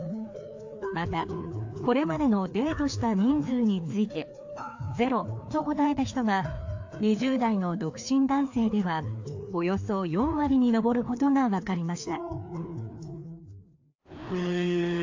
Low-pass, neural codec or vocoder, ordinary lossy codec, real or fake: 7.2 kHz; codec, 16 kHz, 2 kbps, FreqCodec, larger model; AAC, 48 kbps; fake